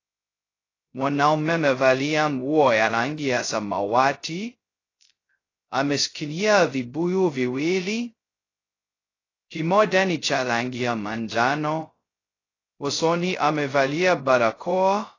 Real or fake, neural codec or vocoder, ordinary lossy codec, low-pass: fake; codec, 16 kHz, 0.2 kbps, FocalCodec; AAC, 32 kbps; 7.2 kHz